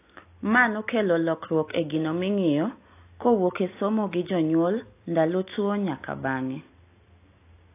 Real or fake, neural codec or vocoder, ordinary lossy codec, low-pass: real; none; AAC, 24 kbps; 3.6 kHz